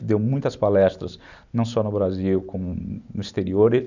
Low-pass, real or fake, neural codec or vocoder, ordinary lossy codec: 7.2 kHz; real; none; none